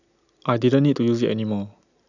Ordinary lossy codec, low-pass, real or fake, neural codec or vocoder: none; 7.2 kHz; real; none